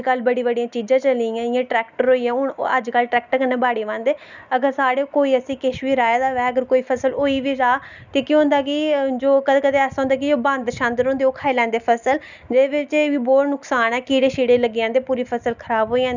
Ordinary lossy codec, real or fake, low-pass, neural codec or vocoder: none; real; 7.2 kHz; none